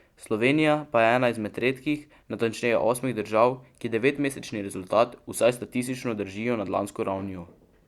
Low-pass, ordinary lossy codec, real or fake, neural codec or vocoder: 19.8 kHz; Opus, 64 kbps; real; none